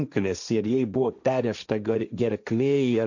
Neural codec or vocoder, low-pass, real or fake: codec, 16 kHz, 1.1 kbps, Voila-Tokenizer; 7.2 kHz; fake